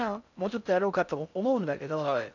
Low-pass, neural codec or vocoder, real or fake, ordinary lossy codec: 7.2 kHz; codec, 16 kHz in and 24 kHz out, 0.6 kbps, FocalCodec, streaming, 4096 codes; fake; none